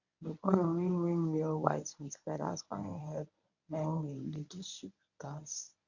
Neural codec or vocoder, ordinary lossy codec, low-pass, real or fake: codec, 24 kHz, 0.9 kbps, WavTokenizer, medium speech release version 1; none; 7.2 kHz; fake